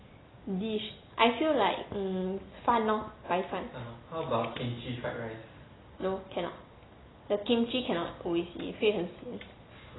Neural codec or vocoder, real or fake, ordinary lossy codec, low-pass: none; real; AAC, 16 kbps; 7.2 kHz